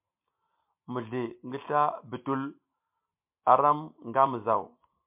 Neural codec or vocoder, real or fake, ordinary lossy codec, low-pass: none; real; MP3, 32 kbps; 3.6 kHz